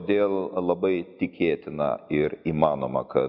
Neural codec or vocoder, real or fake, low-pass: none; real; 5.4 kHz